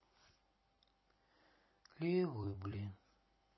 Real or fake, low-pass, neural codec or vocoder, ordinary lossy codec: fake; 7.2 kHz; vocoder, 44.1 kHz, 128 mel bands every 256 samples, BigVGAN v2; MP3, 24 kbps